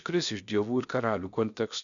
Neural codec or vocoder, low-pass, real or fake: codec, 16 kHz, 0.7 kbps, FocalCodec; 7.2 kHz; fake